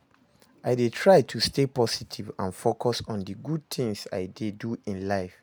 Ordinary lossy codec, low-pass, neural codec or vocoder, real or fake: none; none; none; real